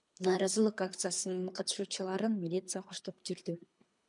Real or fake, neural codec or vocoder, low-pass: fake; codec, 24 kHz, 3 kbps, HILCodec; 10.8 kHz